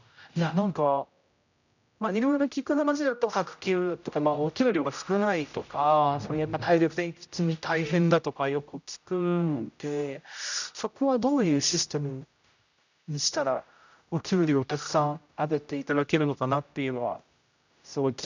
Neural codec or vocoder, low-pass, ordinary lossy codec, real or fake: codec, 16 kHz, 0.5 kbps, X-Codec, HuBERT features, trained on general audio; 7.2 kHz; none; fake